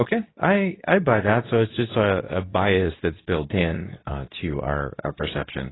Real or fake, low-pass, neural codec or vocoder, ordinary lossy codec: fake; 7.2 kHz; codec, 24 kHz, 0.9 kbps, WavTokenizer, medium speech release version 2; AAC, 16 kbps